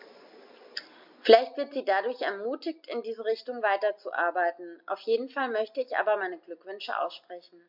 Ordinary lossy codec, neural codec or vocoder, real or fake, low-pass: MP3, 48 kbps; none; real; 5.4 kHz